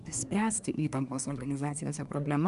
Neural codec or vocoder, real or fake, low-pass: codec, 24 kHz, 1 kbps, SNAC; fake; 10.8 kHz